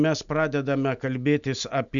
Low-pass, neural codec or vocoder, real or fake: 7.2 kHz; none; real